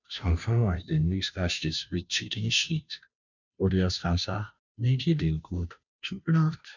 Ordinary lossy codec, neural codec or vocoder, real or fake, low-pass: none; codec, 16 kHz, 0.5 kbps, FunCodec, trained on Chinese and English, 25 frames a second; fake; 7.2 kHz